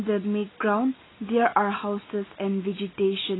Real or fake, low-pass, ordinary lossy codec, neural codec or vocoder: real; 7.2 kHz; AAC, 16 kbps; none